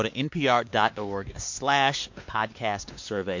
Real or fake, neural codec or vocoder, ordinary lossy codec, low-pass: fake; codec, 16 kHz, 2 kbps, X-Codec, WavLM features, trained on Multilingual LibriSpeech; MP3, 48 kbps; 7.2 kHz